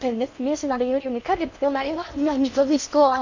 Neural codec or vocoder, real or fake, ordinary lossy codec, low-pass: codec, 16 kHz in and 24 kHz out, 0.6 kbps, FocalCodec, streaming, 4096 codes; fake; none; 7.2 kHz